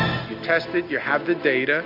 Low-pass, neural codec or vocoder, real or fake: 5.4 kHz; none; real